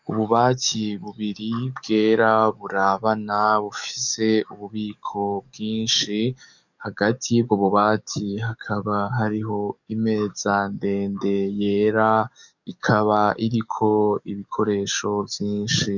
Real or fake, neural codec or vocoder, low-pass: fake; codec, 44.1 kHz, 7.8 kbps, DAC; 7.2 kHz